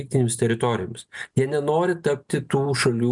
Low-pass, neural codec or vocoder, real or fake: 10.8 kHz; none; real